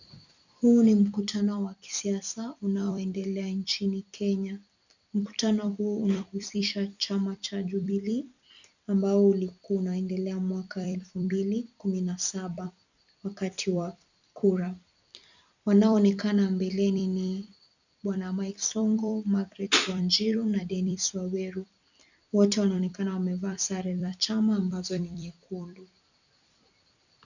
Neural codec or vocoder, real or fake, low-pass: vocoder, 44.1 kHz, 128 mel bands every 256 samples, BigVGAN v2; fake; 7.2 kHz